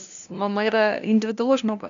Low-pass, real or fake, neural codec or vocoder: 7.2 kHz; fake; codec, 16 kHz, 0.5 kbps, FunCodec, trained on LibriTTS, 25 frames a second